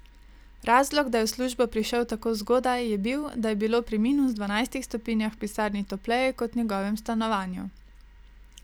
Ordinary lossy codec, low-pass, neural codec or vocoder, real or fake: none; none; none; real